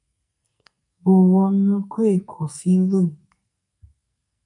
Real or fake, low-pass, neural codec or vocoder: fake; 10.8 kHz; codec, 44.1 kHz, 2.6 kbps, SNAC